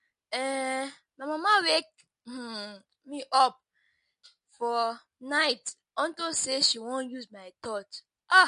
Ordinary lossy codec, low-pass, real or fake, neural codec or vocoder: MP3, 48 kbps; 14.4 kHz; real; none